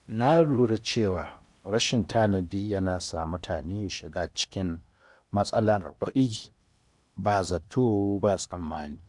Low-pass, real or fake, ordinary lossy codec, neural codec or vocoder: 10.8 kHz; fake; none; codec, 16 kHz in and 24 kHz out, 0.8 kbps, FocalCodec, streaming, 65536 codes